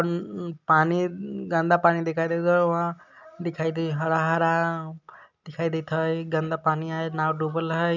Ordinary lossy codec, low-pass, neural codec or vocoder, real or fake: none; 7.2 kHz; none; real